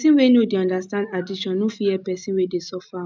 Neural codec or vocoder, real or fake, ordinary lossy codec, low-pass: none; real; none; none